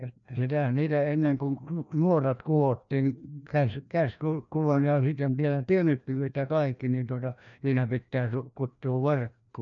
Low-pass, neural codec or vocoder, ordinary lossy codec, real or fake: 7.2 kHz; codec, 16 kHz, 1 kbps, FreqCodec, larger model; none; fake